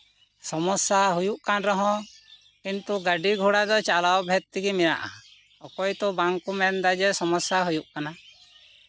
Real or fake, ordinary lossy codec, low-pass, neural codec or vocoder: real; none; none; none